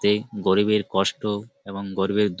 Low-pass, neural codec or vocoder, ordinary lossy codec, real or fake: none; none; none; real